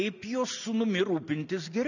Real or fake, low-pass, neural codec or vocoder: real; 7.2 kHz; none